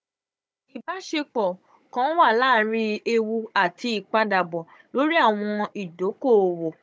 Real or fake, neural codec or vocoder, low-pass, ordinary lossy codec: fake; codec, 16 kHz, 16 kbps, FunCodec, trained on Chinese and English, 50 frames a second; none; none